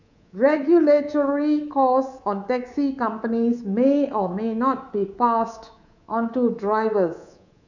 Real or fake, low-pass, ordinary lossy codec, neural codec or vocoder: fake; 7.2 kHz; none; codec, 24 kHz, 3.1 kbps, DualCodec